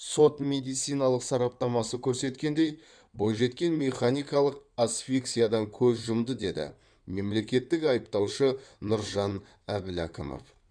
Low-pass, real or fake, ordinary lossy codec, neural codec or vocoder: 9.9 kHz; fake; none; codec, 16 kHz in and 24 kHz out, 2.2 kbps, FireRedTTS-2 codec